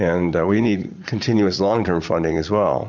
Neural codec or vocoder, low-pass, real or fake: vocoder, 44.1 kHz, 80 mel bands, Vocos; 7.2 kHz; fake